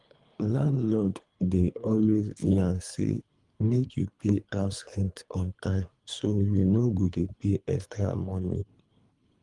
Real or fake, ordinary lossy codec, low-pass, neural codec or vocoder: fake; Opus, 32 kbps; 10.8 kHz; codec, 24 kHz, 3 kbps, HILCodec